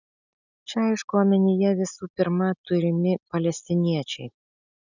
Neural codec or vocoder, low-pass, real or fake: none; 7.2 kHz; real